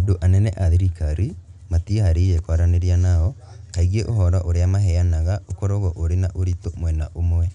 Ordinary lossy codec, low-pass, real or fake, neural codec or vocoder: none; 10.8 kHz; real; none